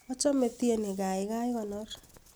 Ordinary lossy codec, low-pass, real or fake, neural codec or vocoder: none; none; real; none